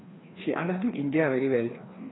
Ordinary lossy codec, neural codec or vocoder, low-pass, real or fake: AAC, 16 kbps; codec, 16 kHz, 2 kbps, FreqCodec, larger model; 7.2 kHz; fake